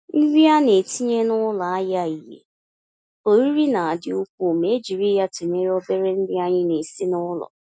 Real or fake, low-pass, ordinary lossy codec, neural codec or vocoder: real; none; none; none